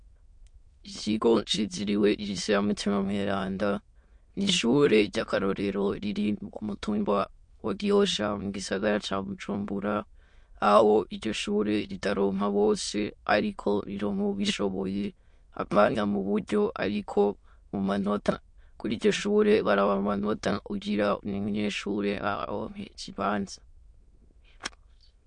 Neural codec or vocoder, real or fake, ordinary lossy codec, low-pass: autoencoder, 22.05 kHz, a latent of 192 numbers a frame, VITS, trained on many speakers; fake; MP3, 48 kbps; 9.9 kHz